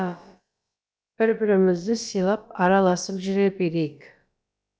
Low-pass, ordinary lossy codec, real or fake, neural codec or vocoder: none; none; fake; codec, 16 kHz, about 1 kbps, DyCAST, with the encoder's durations